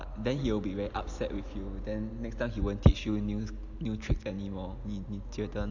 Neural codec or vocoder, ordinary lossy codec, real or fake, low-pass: none; AAC, 48 kbps; real; 7.2 kHz